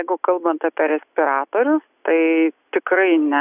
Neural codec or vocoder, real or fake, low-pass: none; real; 3.6 kHz